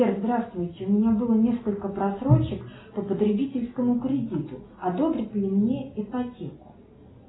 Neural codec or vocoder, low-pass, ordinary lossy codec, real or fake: none; 7.2 kHz; AAC, 16 kbps; real